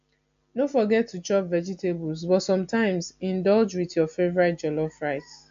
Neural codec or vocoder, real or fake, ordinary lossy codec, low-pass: none; real; AAC, 64 kbps; 7.2 kHz